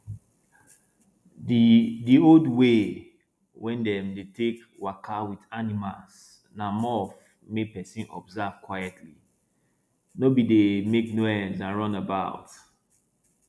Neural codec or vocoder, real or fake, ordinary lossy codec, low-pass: none; real; none; none